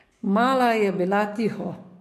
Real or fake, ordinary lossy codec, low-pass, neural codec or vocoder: fake; MP3, 64 kbps; 14.4 kHz; codec, 44.1 kHz, 7.8 kbps, DAC